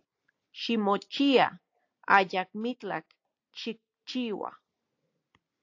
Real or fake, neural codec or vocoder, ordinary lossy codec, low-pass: real; none; AAC, 48 kbps; 7.2 kHz